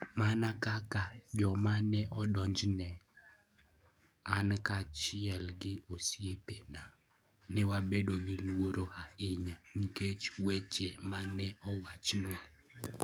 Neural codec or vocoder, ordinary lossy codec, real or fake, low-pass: codec, 44.1 kHz, 7.8 kbps, DAC; none; fake; none